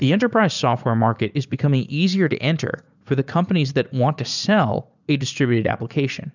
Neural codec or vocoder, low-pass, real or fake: vocoder, 44.1 kHz, 80 mel bands, Vocos; 7.2 kHz; fake